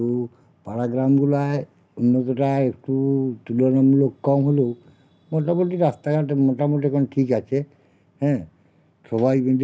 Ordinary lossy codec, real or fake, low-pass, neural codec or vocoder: none; real; none; none